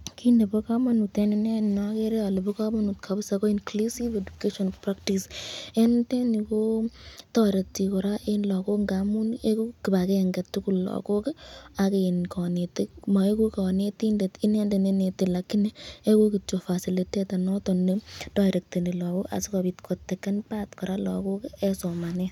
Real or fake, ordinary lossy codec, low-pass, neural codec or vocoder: real; none; 19.8 kHz; none